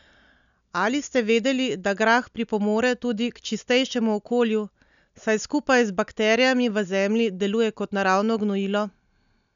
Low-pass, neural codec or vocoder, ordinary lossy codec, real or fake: 7.2 kHz; none; none; real